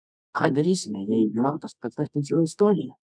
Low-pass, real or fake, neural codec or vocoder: 9.9 kHz; fake; codec, 24 kHz, 0.9 kbps, WavTokenizer, medium music audio release